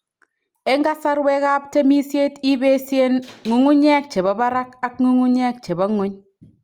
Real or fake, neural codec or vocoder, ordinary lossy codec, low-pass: real; none; Opus, 32 kbps; 19.8 kHz